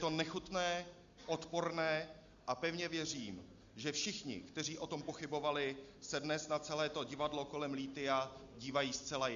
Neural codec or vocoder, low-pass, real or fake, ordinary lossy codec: none; 7.2 kHz; real; Opus, 64 kbps